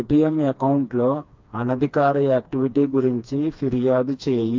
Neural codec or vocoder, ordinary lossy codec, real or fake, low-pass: codec, 16 kHz, 2 kbps, FreqCodec, smaller model; MP3, 48 kbps; fake; 7.2 kHz